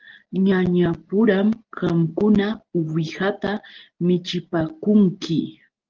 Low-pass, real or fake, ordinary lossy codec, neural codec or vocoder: 7.2 kHz; real; Opus, 16 kbps; none